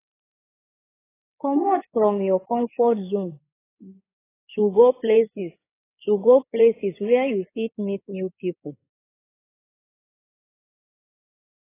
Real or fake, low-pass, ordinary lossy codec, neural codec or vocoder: fake; 3.6 kHz; AAC, 16 kbps; codec, 16 kHz in and 24 kHz out, 2.2 kbps, FireRedTTS-2 codec